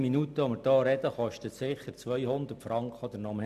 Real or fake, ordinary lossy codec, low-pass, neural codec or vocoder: real; none; 14.4 kHz; none